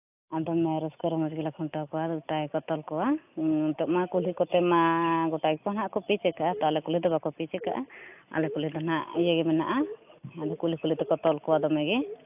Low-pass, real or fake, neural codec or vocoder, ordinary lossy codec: 3.6 kHz; real; none; AAC, 32 kbps